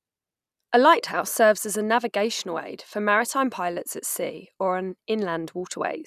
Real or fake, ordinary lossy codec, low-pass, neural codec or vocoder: real; none; 14.4 kHz; none